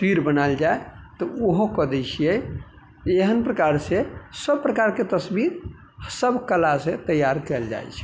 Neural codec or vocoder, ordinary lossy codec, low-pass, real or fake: none; none; none; real